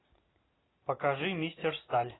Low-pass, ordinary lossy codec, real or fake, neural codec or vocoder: 7.2 kHz; AAC, 16 kbps; real; none